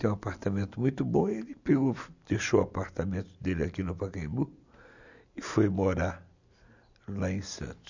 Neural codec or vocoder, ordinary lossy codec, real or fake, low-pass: none; none; real; 7.2 kHz